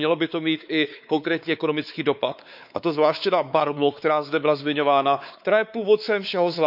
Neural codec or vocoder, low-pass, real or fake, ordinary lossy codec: codec, 16 kHz, 4 kbps, X-Codec, WavLM features, trained on Multilingual LibriSpeech; 5.4 kHz; fake; none